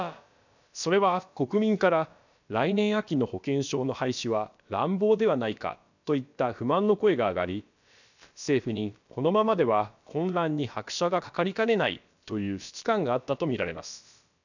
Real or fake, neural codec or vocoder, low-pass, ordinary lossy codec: fake; codec, 16 kHz, about 1 kbps, DyCAST, with the encoder's durations; 7.2 kHz; none